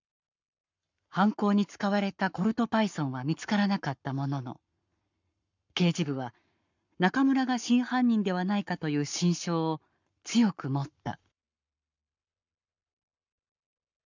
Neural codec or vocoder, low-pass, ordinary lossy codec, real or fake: codec, 44.1 kHz, 7.8 kbps, Pupu-Codec; 7.2 kHz; none; fake